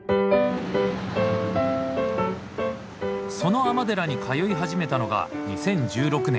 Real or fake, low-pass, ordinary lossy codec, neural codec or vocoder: real; none; none; none